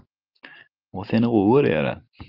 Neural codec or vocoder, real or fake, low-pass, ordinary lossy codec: none; real; 5.4 kHz; Opus, 32 kbps